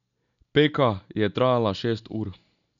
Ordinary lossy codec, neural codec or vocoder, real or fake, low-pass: none; none; real; 7.2 kHz